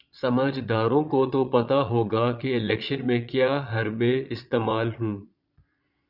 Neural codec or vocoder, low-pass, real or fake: vocoder, 22.05 kHz, 80 mel bands, Vocos; 5.4 kHz; fake